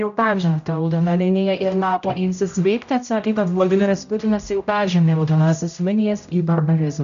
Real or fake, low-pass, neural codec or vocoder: fake; 7.2 kHz; codec, 16 kHz, 0.5 kbps, X-Codec, HuBERT features, trained on general audio